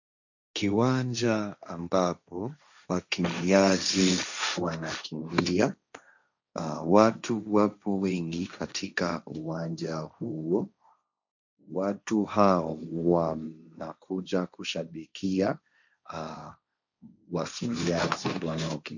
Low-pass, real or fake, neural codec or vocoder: 7.2 kHz; fake; codec, 16 kHz, 1.1 kbps, Voila-Tokenizer